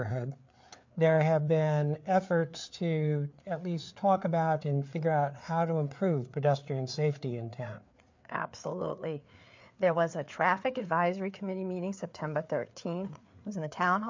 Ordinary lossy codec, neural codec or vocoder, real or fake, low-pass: MP3, 48 kbps; codec, 16 kHz, 4 kbps, FreqCodec, larger model; fake; 7.2 kHz